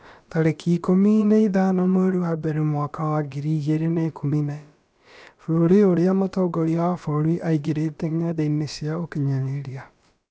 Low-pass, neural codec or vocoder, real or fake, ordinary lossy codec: none; codec, 16 kHz, about 1 kbps, DyCAST, with the encoder's durations; fake; none